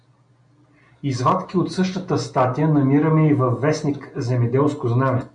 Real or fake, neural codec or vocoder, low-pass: real; none; 9.9 kHz